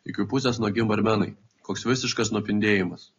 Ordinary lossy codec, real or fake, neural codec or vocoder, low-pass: AAC, 32 kbps; real; none; 7.2 kHz